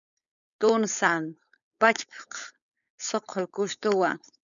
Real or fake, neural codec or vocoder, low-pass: fake; codec, 16 kHz, 4.8 kbps, FACodec; 7.2 kHz